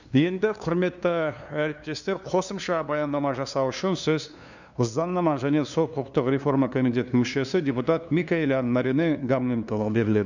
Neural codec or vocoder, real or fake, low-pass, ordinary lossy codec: codec, 16 kHz, 2 kbps, FunCodec, trained on LibriTTS, 25 frames a second; fake; 7.2 kHz; none